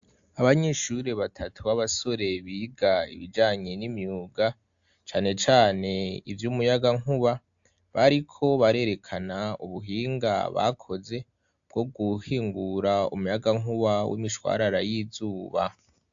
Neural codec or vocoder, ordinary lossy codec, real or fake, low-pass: none; AAC, 64 kbps; real; 7.2 kHz